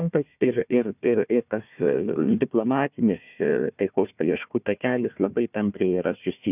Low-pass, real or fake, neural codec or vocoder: 3.6 kHz; fake; codec, 16 kHz, 1 kbps, FunCodec, trained on Chinese and English, 50 frames a second